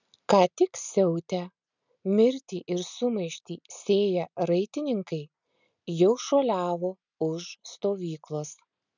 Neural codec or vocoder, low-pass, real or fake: none; 7.2 kHz; real